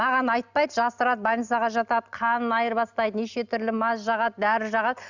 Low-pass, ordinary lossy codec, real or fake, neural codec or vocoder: 7.2 kHz; none; real; none